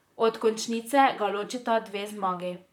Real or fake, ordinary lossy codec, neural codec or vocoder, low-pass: fake; none; vocoder, 44.1 kHz, 128 mel bands, Pupu-Vocoder; 19.8 kHz